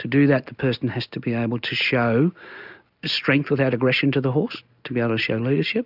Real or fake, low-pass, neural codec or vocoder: real; 5.4 kHz; none